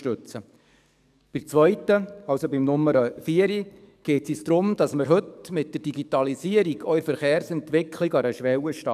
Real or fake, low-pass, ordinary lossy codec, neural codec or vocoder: fake; 14.4 kHz; none; codec, 44.1 kHz, 7.8 kbps, DAC